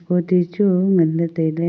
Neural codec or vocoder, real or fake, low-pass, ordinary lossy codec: none; real; none; none